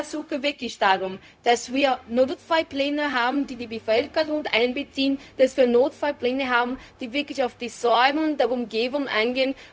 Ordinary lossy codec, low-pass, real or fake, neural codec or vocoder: none; none; fake; codec, 16 kHz, 0.4 kbps, LongCat-Audio-Codec